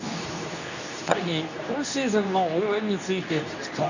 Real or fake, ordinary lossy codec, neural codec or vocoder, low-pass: fake; none; codec, 24 kHz, 0.9 kbps, WavTokenizer, medium speech release version 2; 7.2 kHz